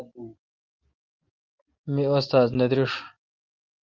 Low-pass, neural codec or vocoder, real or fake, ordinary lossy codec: 7.2 kHz; none; real; Opus, 32 kbps